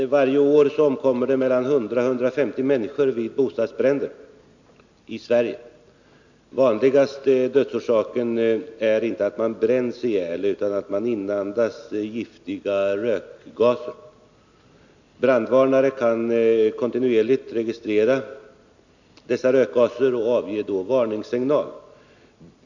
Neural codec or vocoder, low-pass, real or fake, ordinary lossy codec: none; 7.2 kHz; real; none